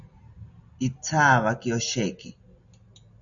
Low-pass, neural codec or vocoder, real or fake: 7.2 kHz; none; real